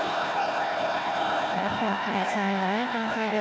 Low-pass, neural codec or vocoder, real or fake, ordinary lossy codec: none; codec, 16 kHz, 1 kbps, FunCodec, trained on Chinese and English, 50 frames a second; fake; none